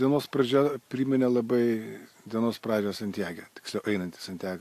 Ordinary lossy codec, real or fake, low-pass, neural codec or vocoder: AAC, 64 kbps; real; 14.4 kHz; none